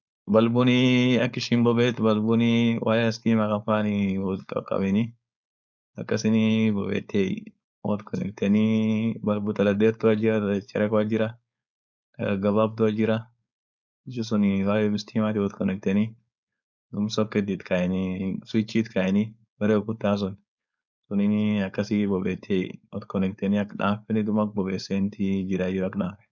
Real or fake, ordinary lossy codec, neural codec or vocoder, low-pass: fake; none; codec, 16 kHz, 4.8 kbps, FACodec; 7.2 kHz